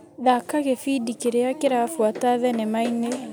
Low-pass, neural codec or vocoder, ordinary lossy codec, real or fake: none; none; none; real